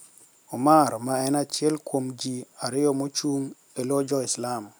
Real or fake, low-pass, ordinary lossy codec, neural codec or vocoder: real; none; none; none